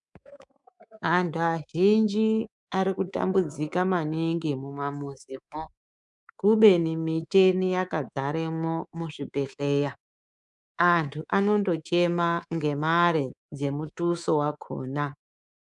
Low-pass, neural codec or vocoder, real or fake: 10.8 kHz; codec, 24 kHz, 3.1 kbps, DualCodec; fake